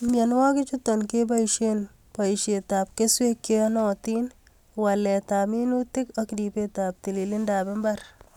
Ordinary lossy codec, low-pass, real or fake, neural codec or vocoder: none; 19.8 kHz; real; none